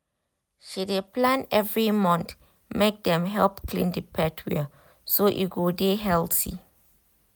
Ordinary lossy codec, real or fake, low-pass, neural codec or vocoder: none; real; none; none